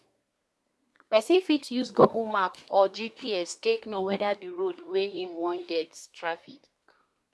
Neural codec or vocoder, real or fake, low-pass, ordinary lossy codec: codec, 24 kHz, 1 kbps, SNAC; fake; none; none